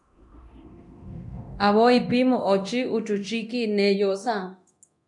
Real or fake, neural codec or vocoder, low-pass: fake; codec, 24 kHz, 0.9 kbps, DualCodec; 10.8 kHz